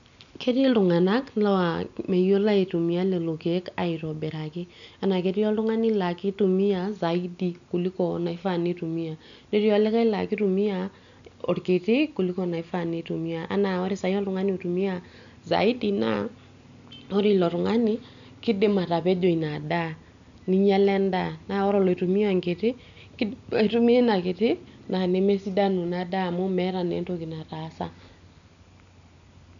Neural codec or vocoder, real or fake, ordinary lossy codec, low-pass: none; real; none; 7.2 kHz